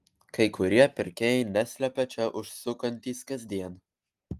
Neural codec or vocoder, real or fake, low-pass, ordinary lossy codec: none; real; 14.4 kHz; Opus, 32 kbps